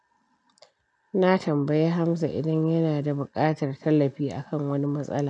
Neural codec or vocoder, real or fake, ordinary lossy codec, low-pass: none; real; none; 10.8 kHz